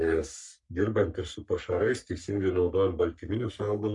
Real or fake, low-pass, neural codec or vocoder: fake; 10.8 kHz; codec, 44.1 kHz, 3.4 kbps, Pupu-Codec